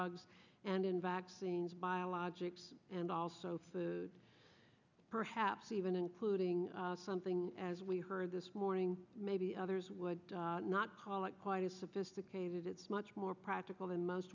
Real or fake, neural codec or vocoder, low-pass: real; none; 7.2 kHz